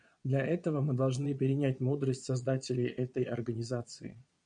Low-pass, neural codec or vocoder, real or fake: 9.9 kHz; vocoder, 22.05 kHz, 80 mel bands, Vocos; fake